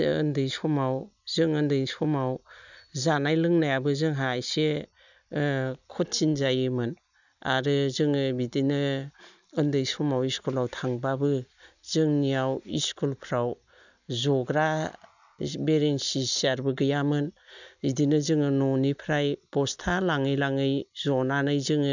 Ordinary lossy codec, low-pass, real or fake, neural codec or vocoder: none; 7.2 kHz; real; none